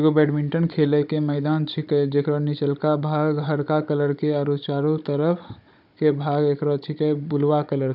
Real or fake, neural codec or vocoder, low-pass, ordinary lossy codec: fake; codec, 16 kHz, 16 kbps, FunCodec, trained on Chinese and English, 50 frames a second; 5.4 kHz; none